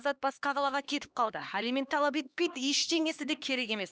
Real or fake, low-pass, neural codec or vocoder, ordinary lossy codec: fake; none; codec, 16 kHz, 2 kbps, X-Codec, HuBERT features, trained on LibriSpeech; none